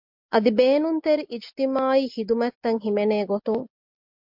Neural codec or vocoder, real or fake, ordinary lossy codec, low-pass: none; real; MP3, 48 kbps; 5.4 kHz